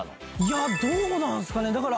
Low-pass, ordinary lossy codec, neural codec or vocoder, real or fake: none; none; none; real